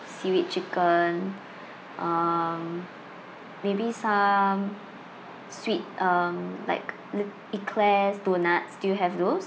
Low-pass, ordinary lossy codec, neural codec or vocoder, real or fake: none; none; none; real